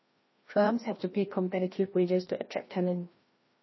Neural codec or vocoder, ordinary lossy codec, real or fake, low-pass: codec, 16 kHz, 1 kbps, FreqCodec, larger model; MP3, 24 kbps; fake; 7.2 kHz